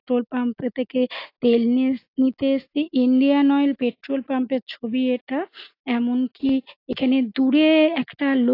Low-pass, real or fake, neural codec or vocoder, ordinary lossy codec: 5.4 kHz; real; none; AAC, 32 kbps